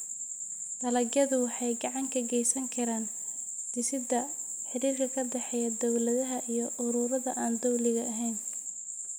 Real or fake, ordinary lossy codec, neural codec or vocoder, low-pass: real; none; none; none